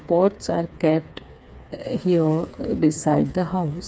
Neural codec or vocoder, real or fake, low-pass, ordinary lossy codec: codec, 16 kHz, 4 kbps, FreqCodec, smaller model; fake; none; none